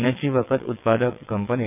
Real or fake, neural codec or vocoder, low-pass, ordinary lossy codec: fake; vocoder, 22.05 kHz, 80 mel bands, WaveNeXt; 3.6 kHz; none